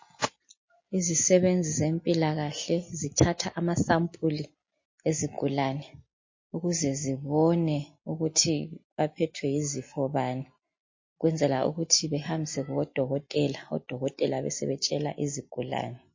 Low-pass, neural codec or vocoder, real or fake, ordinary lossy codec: 7.2 kHz; none; real; MP3, 32 kbps